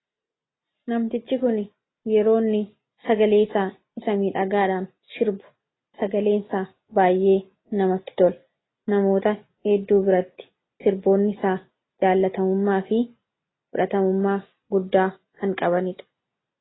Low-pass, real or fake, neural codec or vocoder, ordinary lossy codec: 7.2 kHz; real; none; AAC, 16 kbps